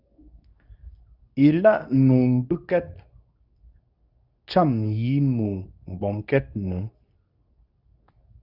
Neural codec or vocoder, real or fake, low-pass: codec, 24 kHz, 0.9 kbps, WavTokenizer, medium speech release version 2; fake; 5.4 kHz